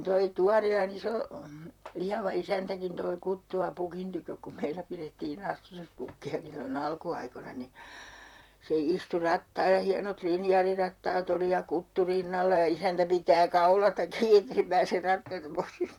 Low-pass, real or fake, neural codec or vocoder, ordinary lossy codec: 19.8 kHz; fake; vocoder, 44.1 kHz, 128 mel bands, Pupu-Vocoder; none